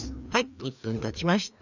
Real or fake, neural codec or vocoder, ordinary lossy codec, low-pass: fake; codec, 16 kHz, 2 kbps, FreqCodec, larger model; none; 7.2 kHz